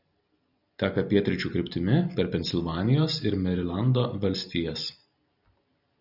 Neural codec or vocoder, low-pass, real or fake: none; 5.4 kHz; real